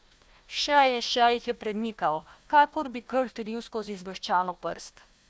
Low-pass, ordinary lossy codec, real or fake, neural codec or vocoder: none; none; fake; codec, 16 kHz, 1 kbps, FunCodec, trained on Chinese and English, 50 frames a second